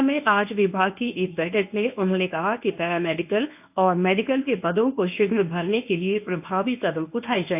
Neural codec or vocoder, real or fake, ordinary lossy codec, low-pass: codec, 24 kHz, 0.9 kbps, WavTokenizer, medium speech release version 1; fake; AAC, 32 kbps; 3.6 kHz